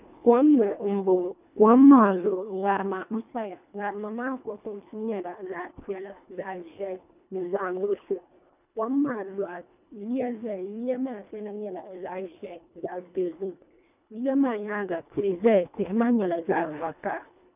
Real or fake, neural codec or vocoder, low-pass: fake; codec, 24 kHz, 1.5 kbps, HILCodec; 3.6 kHz